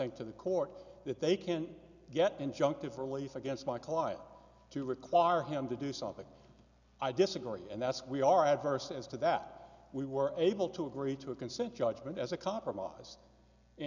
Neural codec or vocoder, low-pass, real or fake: none; 7.2 kHz; real